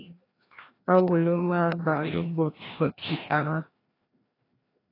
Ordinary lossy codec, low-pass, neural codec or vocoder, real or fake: AAC, 24 kbps; 5.4 kHz; codec, 16 kHz, 1 kbps, FreqCodec, larger model; fake